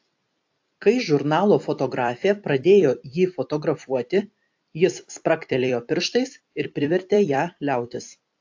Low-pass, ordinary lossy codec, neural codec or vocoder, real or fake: 7.2 kHz; AAC, 48 kbps; vocoder, 44.1 kHz, 128 mel bands every 256 samples, BigVGAN v2; fake